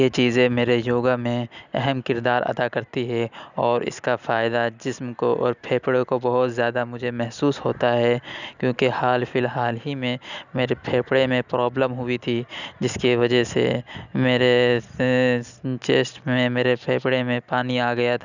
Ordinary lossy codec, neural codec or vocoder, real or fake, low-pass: none; none; real; 7.2 kHz